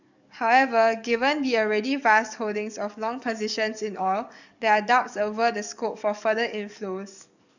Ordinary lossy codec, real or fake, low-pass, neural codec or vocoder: none; fake; 7.2 kHz; codec, 44.1 kHz, 7.8 kbps, DAC